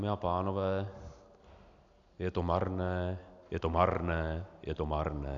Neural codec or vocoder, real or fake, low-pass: none; real; 7.2 kHz